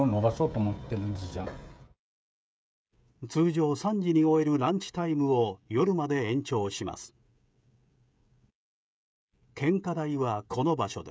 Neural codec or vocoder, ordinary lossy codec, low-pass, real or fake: codec, 16 kHz, 16 kbps, FreqCodec, smaller model; none; none; fake